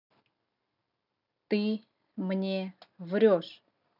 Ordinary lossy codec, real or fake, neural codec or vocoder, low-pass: none; real; none; 5.4 kHz